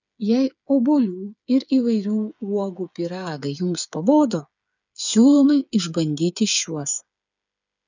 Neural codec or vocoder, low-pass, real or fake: codec, 16 kHz, 8 kbps, FreqCodec, smaller model; 7.2 kHz; fake